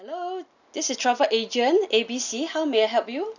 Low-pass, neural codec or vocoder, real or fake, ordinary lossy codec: 7.2 kHz; none; real; none